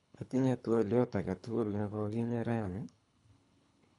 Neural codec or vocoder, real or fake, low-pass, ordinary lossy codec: codec, 24 kHz, 3 kbps, HILCodec; fake; 10.8 kHz; none